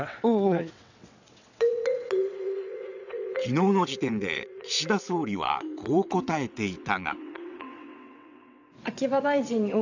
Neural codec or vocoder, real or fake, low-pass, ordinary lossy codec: vocoder, 22.05 kHz, 80 mel bands, WaveNeXt; fake; 7.2 kHz; none